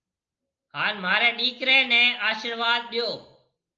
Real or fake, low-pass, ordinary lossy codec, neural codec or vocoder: real; 7.2 kHz; Opus, 24 kbps; none